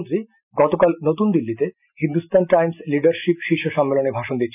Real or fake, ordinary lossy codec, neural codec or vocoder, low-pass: real; none; none; 3.6 kHz